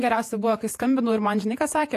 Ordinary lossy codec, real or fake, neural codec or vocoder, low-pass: AAC, 64 kbps; fake; vocoder, 48 kHz, 128 mel bands, Vocos; 14.4 kHz